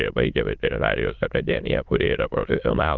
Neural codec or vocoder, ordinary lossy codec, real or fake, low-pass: autoencoder, 22.05 kHz, a latent of 192 numbers a frame, VITS, trained on many speakers; Opus, 32 kbps; fake; 7.2 kHz